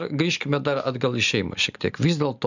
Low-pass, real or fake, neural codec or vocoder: 7.2 kHz; real; none